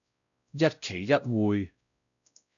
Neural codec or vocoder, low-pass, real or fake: codec, 16 kHz, 0.5 kbps, X-Codec, WavLM features, trained on Multilingual LibriSpeech; 7.2 kHz; fake